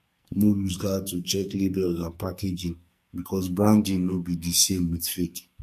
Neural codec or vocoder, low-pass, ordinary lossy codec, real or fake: codec, 44.1 kHz, 2.6 kbps, SNAC; 14.4 kHz; MP3, 64 kbps; fake